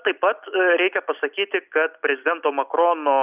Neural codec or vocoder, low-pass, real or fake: none; 3.6 kHz; real